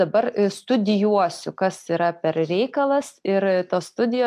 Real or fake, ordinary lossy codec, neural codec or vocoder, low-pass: real; MP3, 96 kbps; none; 14.4 kHz